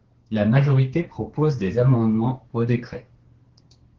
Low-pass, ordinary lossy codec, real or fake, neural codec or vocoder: 7.2 kHz; Opus, 16 kbps; fake; codec, 32 kHz, 1.9 kbps, SNAC